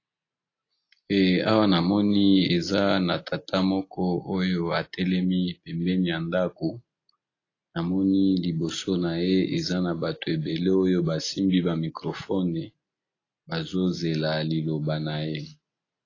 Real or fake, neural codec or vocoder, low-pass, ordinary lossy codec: real; none; 7.2 kHz; AAC, 32 kbps